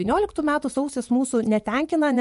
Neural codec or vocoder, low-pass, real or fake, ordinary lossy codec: vocoder, 24 kHz, 100 mel bands, Vocos; 10.8 kHz; fake; MP3, 64 kbps